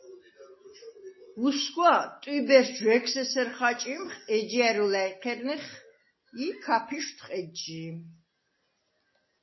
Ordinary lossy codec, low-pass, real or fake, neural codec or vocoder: MP3, 24 kbps; 7.2 kHz; real; none